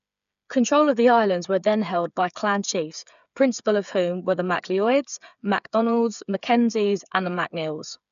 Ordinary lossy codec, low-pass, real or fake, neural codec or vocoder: none; 7.2 kHz; fake; codec, 16 kHz, 8 kbps, FreqCodec, smaller model